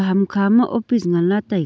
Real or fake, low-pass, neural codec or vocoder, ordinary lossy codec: real; none; none; none